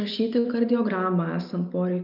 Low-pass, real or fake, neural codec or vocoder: 5.4 kHz; real; none